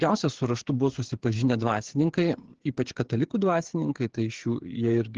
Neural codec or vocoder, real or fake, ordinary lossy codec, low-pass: codec, 16 kHz, 8 kbps, FreqCodec, smaller model; fake; Opus, 16 kbps; 7.2 kHz